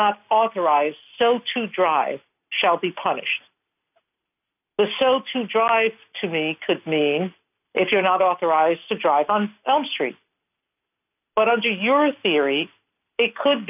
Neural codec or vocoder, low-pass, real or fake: none; 3.6 kHz; real